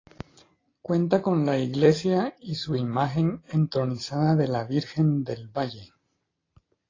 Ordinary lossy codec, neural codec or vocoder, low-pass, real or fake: AAC, 32 kbps; none; 7.2 kHz; real